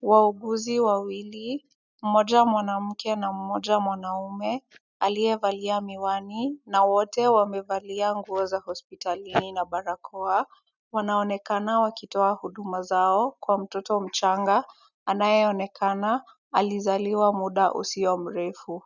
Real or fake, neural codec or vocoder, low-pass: real; none; 7.2 kHz